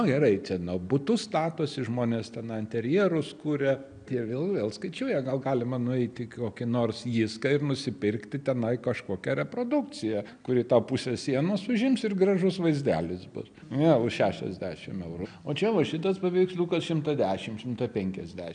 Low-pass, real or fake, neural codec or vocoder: 9.9 kHz; real; none